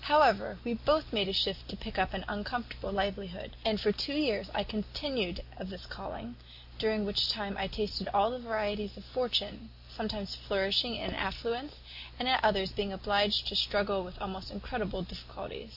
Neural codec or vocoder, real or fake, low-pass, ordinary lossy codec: none; real; 5.4 kHz; AAC, 48 kbps